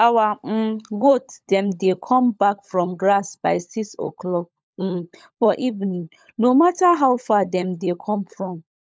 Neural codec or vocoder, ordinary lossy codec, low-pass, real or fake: codec, 16 kHz, 16 kbps, FunCodec, trained on LibriTTS, 50 frames a second; none; none; fake